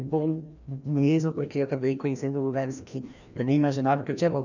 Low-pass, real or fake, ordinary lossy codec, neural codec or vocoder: 7.2 kHz; fake; none; codec, 16 kHz, 1 kbps, FreqCodec, larger model